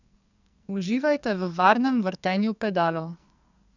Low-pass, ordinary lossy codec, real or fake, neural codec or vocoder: 7.2 kHz; none; fake; codec, 44.1 kHz, 2.6 kbps, SNAC